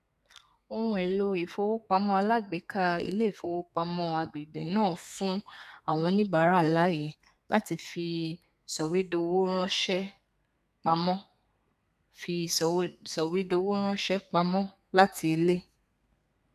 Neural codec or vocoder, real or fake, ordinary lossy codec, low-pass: codec, 32 kHz, 1.9 kbps, SNAC; fake; none; 14.4 kHz